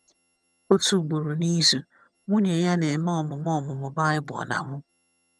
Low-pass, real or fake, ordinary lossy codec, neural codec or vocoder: none; fake; none; vocoder, 22.05 kHz, 80 mel bands, HiFi-GAN